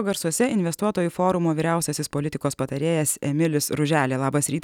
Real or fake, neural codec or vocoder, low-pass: real; none; 19.8 kHz